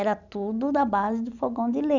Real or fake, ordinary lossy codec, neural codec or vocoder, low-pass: real; none; none; 7.2 kHz